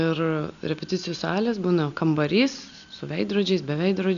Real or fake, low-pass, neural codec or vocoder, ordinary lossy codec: real; 7.2 kHz; none; MP3, 96 kbps